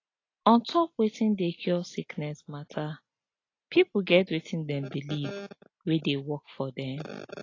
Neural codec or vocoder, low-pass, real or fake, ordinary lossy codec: none; 7.2 kHz; real; AAC, 32 kbps